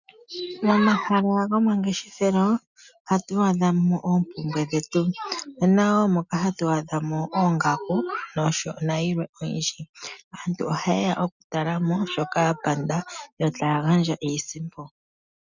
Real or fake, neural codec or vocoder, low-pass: real; none; 7.2 kHz